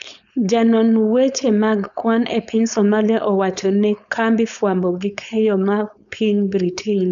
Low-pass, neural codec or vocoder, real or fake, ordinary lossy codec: 7.2 kHz; codec, 16 kHz, 4.8 kbps, FACodec; fake; none